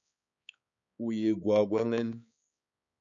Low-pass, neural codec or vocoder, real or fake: 7.2 kHz; codec, 16 kHz, 4 kbps, X-Codec, HuBERT features, trained on balanced general audio; fake